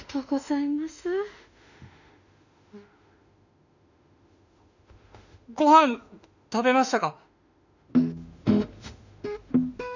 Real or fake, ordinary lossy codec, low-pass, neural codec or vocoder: fake; none; 7.2 kHz; autoencoder, 48 kHz, 32 numbers a frame, DAC-VAE, trained on Japanese speech